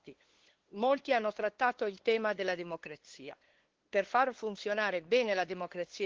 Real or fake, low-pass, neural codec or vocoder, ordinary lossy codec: fake; 7.2 kHz; codec, 16 kHz, 2 kbps, FunCodec, trained on LibriTTS, 25 frames a second; Opus, 16 kbps